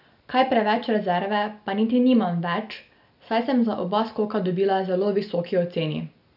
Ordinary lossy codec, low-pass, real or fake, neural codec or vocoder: none; 5.4 kHz; real; none